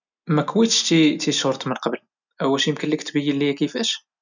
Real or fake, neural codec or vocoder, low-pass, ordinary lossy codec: real; none; 7.2 kHz; none